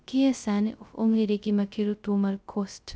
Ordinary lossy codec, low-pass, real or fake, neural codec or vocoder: none; none; fake; codec, 16 kHz, 0.2 kbps, FocalCodec